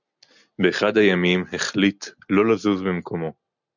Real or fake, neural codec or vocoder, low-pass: real; none; 7.2 kHz